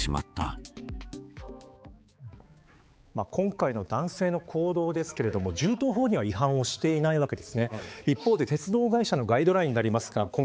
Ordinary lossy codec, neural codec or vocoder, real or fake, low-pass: none; codec, 16 kHz, 4 kbps, X-Codec, HuBERT features, trained on balanced general audio; fake; none